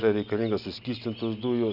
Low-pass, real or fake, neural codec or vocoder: 5.4 kHz; real; none